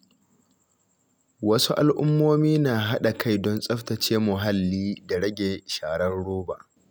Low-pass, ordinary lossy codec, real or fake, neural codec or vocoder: none; none; real; none